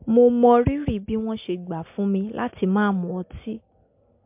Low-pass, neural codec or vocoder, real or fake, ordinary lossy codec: 3.6 kHz; none; real; MP3, 32 kbps